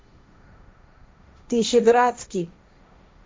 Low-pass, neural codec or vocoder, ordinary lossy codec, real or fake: none; codec, 16 kHz, 1.1 kbps, Voila-Tokenizer; none; fake